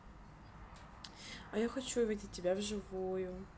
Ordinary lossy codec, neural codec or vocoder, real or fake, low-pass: none; none; real; none